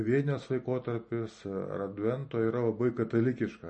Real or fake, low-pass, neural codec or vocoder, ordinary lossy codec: real; 10.8 kHz; none; MP3, 32 kbps